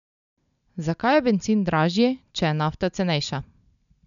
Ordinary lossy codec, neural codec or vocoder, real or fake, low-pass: none; none; real; 7.2 kHz